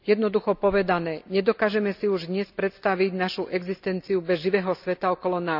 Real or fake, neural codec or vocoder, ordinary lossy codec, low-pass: real; none; none; 5.4 kHz